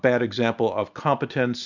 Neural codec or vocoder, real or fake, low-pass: none; real; 7.2 kHz